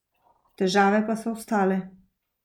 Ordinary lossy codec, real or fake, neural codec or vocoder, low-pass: MP3, 96 kbps; real; none; 19.8 kHz